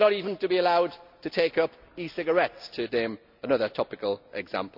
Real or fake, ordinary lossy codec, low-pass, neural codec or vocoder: real; none; 5.4 kHz; none